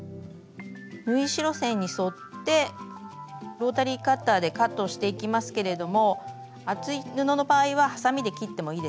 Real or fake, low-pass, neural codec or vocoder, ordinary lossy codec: real; none; none; none